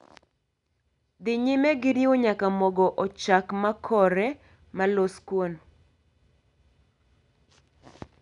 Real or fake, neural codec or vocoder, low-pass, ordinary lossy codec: real; none; 10.8 kHz; none